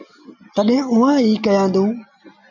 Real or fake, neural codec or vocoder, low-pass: real; none; 7.2 kHz